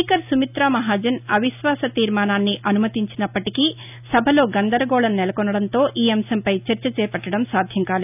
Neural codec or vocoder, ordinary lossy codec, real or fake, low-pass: none; none; real; 3.6 kHz